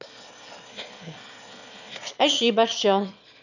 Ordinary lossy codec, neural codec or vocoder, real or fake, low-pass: none; autoencoder, 22.05 kHz, a latent of 192 numbers a frame, VITS, trained on one speaker; fake; 7.2 kHz